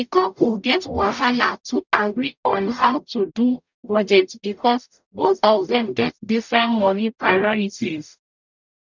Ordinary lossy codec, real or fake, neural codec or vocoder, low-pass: none; fake; codec, 44.1 kHz, 0.9 kbps, DAC; 7.2 kHz